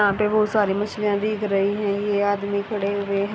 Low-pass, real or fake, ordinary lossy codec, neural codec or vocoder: none; real; none; none